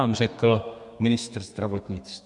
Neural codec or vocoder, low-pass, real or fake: codec, 32 kHz, 1.9 kbps, SNAC; 10.8 kHz; fake